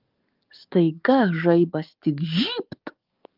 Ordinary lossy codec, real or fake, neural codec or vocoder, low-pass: Opus, 24 kbps; fake; codec, 44.1 kHz, 7.8 kbps, DAC; 5.4 kHz